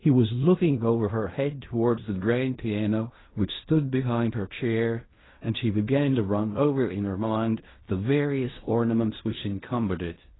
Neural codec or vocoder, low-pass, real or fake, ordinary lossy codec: codec, 16 kHz in and 24 kHz out, 0.4 kbps, LongCat-Audio-Codec, fine tuned four codebook decoder; 7.2 kHz; fake; AAC, 16 kbps